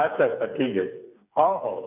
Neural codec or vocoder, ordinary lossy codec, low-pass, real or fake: codec, 16 kHz, 4 kbps, FreqCodec, smaller model; none; 3.6 kHz; fake